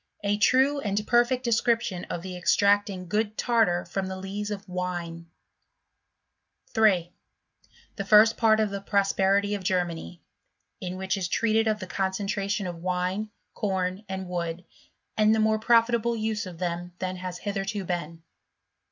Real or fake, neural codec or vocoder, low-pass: real; none; 7.2 kHz